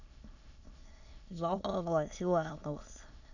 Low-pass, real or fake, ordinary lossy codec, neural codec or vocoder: 7.2 kHz; fake; none; autoencoder, 22.05 kHz, a latent of 192 numbers a frame, VITS, trained on many speakers